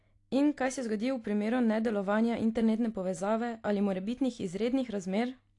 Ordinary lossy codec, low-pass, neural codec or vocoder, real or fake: AAC, 48 kbps; 10.8 kHz; none; real